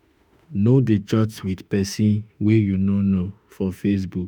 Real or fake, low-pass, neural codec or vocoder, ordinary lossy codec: fake; none; autoencoder, 48 kHz, 32 numbers a frame, DAC-VAE, trained on Japanese speech; none